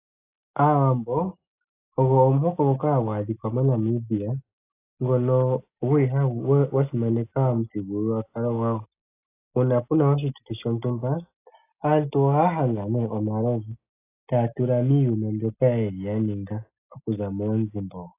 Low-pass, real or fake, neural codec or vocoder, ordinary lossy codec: 3.6 kHz; real; none; AAC, 24 kbps